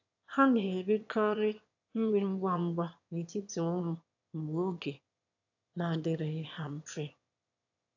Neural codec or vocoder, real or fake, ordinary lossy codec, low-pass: autoencoder, 22.05 kHz, a latent of 192 numbers a frame, VITS, trained on one speaker; fake; AAC, 48 kbps; 7.2 kHz